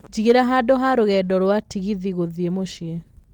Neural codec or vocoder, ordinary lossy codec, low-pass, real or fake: none; Opus, 24 kbps; 19.8 kHz; real